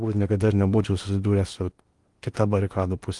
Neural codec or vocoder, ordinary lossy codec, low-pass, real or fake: codec, 16 kHz in and 24 kHz out, 0.8 kbps, FocalCodec, streaming, 65536 codes; Opus, 24 kbps; 10.8 kHz; fake